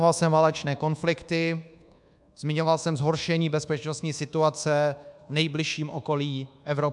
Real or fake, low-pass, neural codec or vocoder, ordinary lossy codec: fake; 10.8 kHz; codec, 24 kHz, 1.2 kbps, DualCodec; MP3, 96 kbps